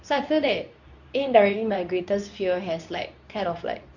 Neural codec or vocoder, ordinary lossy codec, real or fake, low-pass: codec, 24 kHz, 0.9 kbps, WavTokenizer, medium speech release version 2; none; fake; 7.2 kHz